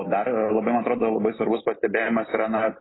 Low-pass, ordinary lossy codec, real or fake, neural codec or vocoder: 7.2 kHz; AAC, 16 kbps; real; none